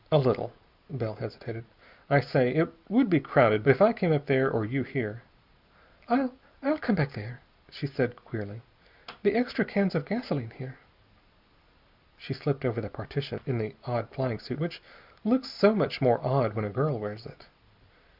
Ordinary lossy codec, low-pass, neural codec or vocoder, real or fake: Opus, 64 kbps; 5.4 kHz; none; real